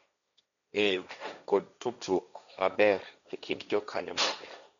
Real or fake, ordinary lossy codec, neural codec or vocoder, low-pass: fake; none; codec, 16 kHz, 1.1 kbps, Voila-Tokenizer; 7.2 kHz